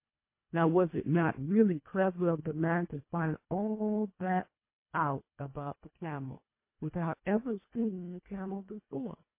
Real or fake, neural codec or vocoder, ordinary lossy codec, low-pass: fake; codec, 24 kHz, 1.5 kbps, HILCodec; MP3, 24 kbps; 3.6 kHz